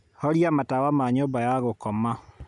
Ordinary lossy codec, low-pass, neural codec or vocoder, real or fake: none; 10.8 kHz; none; real